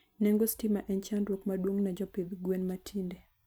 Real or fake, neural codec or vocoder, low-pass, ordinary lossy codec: real; none; none; none